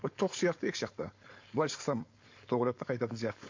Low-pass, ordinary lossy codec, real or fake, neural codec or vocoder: 7.2 kHz; MP3, 48 kbps; fake; codec, 16 kHz, 4.8 kbps, FACodec